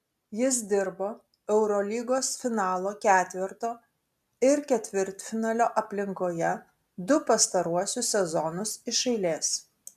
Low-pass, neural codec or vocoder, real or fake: 14.4 kHz; none; real